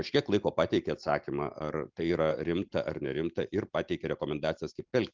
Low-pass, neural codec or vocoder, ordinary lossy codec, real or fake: 7.2 kHz; none; Opus, 32 kbps; real